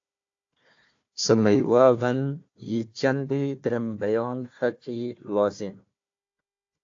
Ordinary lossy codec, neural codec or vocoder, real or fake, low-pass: AAC, 48 kbps; codec, 16 kHz, 1 kbps, FunCodec, trained on Chinese and English, 50 frames a second; fake; 7.2 kHz